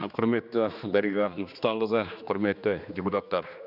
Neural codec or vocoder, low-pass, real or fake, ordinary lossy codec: codec, 16 kHz, 2 kbps, X-Codec, HuBERT features, trained on general audio; 5.4 kHz; fake; none